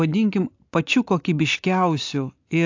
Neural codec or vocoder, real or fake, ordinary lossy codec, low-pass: none; real; AAC, 48 kbps; 7.2 kHz